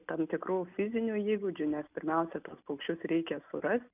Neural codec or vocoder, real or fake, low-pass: none; real; 3.6 kHz